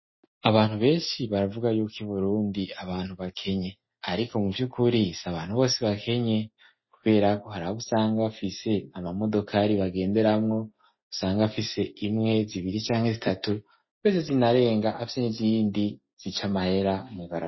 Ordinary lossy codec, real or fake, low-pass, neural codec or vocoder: MP3, 24 kbps; real; 7.2 kHz; none